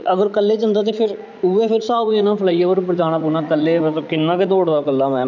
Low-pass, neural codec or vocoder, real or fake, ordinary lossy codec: 7.2 kHz; vocoder, 44.1 kHz, 80 mel bands, Vocos; fake; none